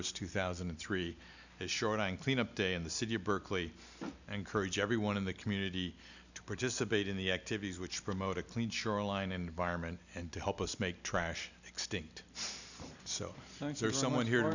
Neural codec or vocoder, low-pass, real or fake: none; 7.2 kHz; real